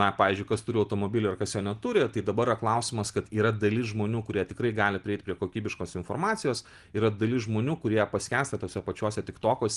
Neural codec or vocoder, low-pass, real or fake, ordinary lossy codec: none; 10.8 kHz; real; Opus, 24 kbps